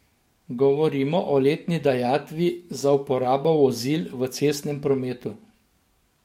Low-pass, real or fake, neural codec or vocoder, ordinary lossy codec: 19.8 kHz; fake; codec, 44.1 kHz, 7.8 kbps, DAC; MP3, 64 kbps